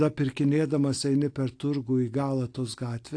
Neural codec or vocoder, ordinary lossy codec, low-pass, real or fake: none; AAC, 48 kbps; 9.9 kHz; real